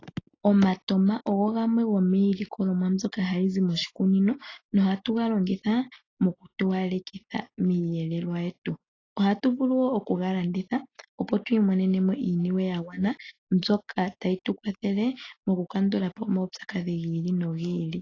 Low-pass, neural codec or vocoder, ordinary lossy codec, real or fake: 7.2 kHz; none; AAC, 32 kbps; real